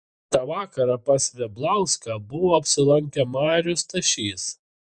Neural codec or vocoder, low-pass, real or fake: vocoder, 48 kHz, 128 mel bands, Vocos; 9.9 kHz; fake